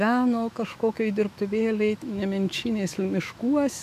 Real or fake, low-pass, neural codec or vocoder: fake; 14.4 kHz; autoencoder, 48 kHz, 128 numbers a frame, DAC-VAE, trained on Japanese speech